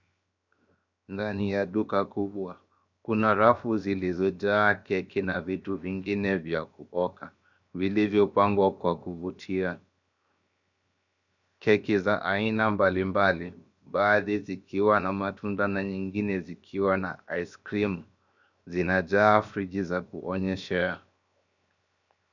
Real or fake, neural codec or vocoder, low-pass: fake; codec, 16 kHz, 0.7 kbps, FocalCodec; 7.2 kHz